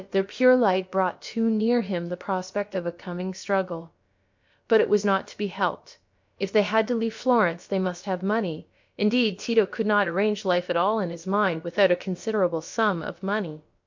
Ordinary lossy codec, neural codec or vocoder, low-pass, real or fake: MP3, 48 kbps; codec, 16 kHz, about 1 kbps, DyCAST, with the encoder's durations; 7.2 kHz; fake